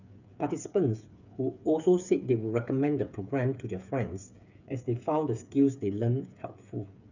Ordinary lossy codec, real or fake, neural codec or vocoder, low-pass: none; fake; codec, 16 kHz, 8 kbps, FreqCodec, smaller model; 7.2 kHz